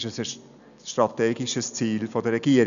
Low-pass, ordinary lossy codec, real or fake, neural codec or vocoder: 7.2 kHz; none; real; none